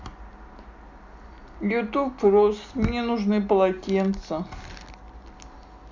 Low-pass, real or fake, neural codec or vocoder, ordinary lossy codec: 7.2 kHz; real; none; MP3, 64 kbps